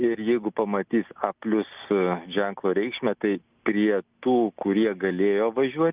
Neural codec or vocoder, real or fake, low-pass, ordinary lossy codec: none; real; 3.6 kHz; Opus, 24 kbps